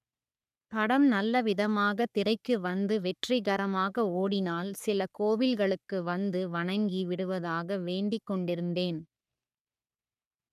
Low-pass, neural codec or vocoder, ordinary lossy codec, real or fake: 14.4 kHz; codec, 44.1 kHz, 3.4 kbps, Pupu-Codec; none; fake